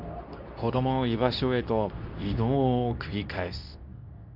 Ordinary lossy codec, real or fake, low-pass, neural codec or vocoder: none; fake; 5.4 kHz; codec, 24 kHz, 0.9 kbps, WavTokenizer, medium speech release version 2